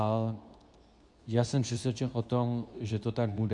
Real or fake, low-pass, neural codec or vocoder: fake; 10.8 kHz; codec, 24 kHz, 0.9 kbps, WavTokenizer, medium speech release version 2